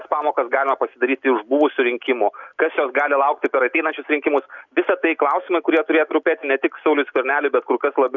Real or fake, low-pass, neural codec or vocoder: real; 7.2 kHz; none